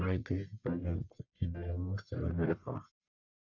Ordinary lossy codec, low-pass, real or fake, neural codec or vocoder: none; 7.2 kHz; fake; codec, 44.1 kHz, 1.7 kbps, Pupu-Codec